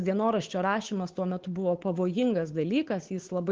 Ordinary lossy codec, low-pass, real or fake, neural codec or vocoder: Opus, 16 kbps; 7.2 kHz; fake; codec, 16 kHz, 8 kbps, FunCodec, trained on Chinese and English, 25 frames a second